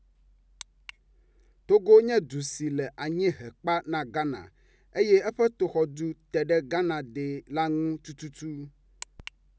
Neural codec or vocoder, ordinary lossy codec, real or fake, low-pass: none; none; real; none